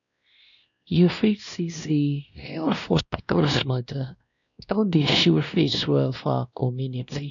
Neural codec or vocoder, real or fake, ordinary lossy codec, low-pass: codec, 16 kHz, 1 kbps, X-Codec, WavLM features, trained on Multilingual LibriSpeech; fake; none; 7.2 kHz